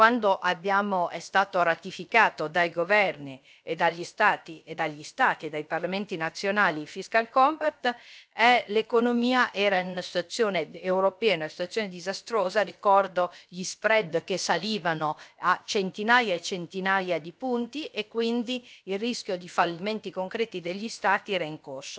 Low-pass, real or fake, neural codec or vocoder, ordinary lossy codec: none; fake; codec, 16 kHz, about 1 kbps, DyCAST, with the encoder's durations; none